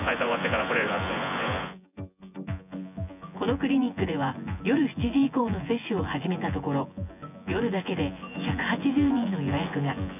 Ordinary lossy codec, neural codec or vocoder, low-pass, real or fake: none; vocoder, 24 kHz, 100 mel bands, Vocos; 3.6 kHz; fake